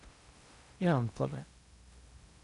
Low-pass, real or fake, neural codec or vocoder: 10.8 kHz; fake; codec, 16 kHz in and 24 kHz out, 0.8 kbps, FocalCodec, streaming, 65536 codes